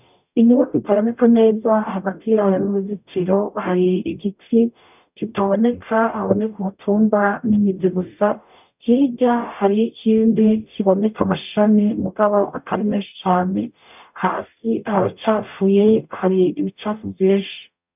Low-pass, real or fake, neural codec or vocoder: 3.6 kHz; fake; codec, 44.1 kHz, 0.9 kbps, DAC